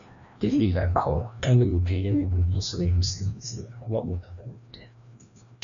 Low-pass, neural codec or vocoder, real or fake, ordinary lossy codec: 7.2 kHz; codec, 16 kHz, 1 kbps, FreqCodec, larger model; fake; MP3, 96 kbps